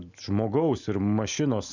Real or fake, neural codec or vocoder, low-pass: real; none; 7.2 kHz